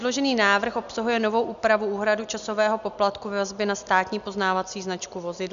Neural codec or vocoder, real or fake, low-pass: none; real; 7.2 kHz